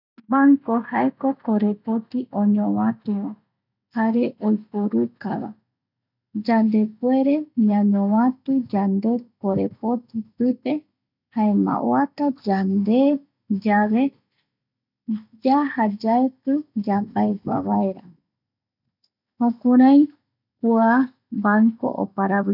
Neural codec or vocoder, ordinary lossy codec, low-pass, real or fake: none; none; 5.4 kHz; real